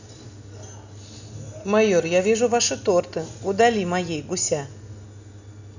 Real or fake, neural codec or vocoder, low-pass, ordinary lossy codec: real; none; 7.2 kHz; none